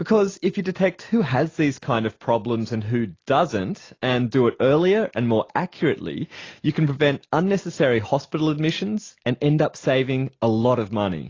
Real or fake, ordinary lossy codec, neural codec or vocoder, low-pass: real; AAC, 32 kbps; none; 7.2 kHz